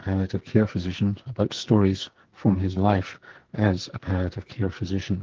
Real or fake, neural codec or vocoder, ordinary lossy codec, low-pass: fake; codec, 44.1 kHz, 2.6 kbps, SNAC; Opus, 16 kbps; 7.2 kHz